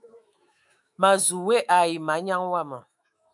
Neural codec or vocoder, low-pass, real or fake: autoencoder, 48 kHz, 128 numbers a frame, DAC-VAE, trained on Japanese speech; 10.8 kHz; fake